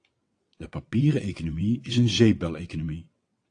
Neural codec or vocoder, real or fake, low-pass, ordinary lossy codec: vocoder, 22.05 kHz, 80 mel bands, WaveNeXt; fake; 9.9 kHz; AAC, 48 kbps